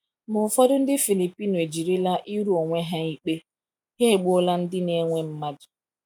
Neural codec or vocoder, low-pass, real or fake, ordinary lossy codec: none; 19.8 kHz; real; none